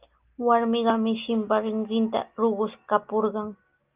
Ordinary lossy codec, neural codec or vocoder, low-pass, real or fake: Opus, 24 kbps; none; 3.6 kHz; real